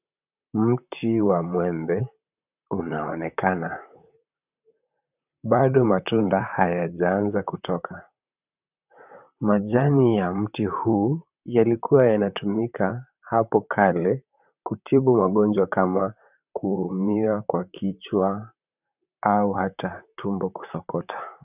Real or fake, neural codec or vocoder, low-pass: fake; vocoder, 44.1 kHz, 128 mel bands, Pupu-Vocoder; 3.6 kHz